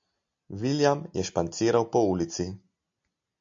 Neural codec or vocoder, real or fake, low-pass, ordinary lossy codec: none; real; 7.2 kHz; MP3, 48 kbps